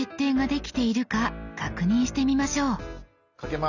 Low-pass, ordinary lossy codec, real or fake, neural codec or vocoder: 7.2 kHz; none; real; none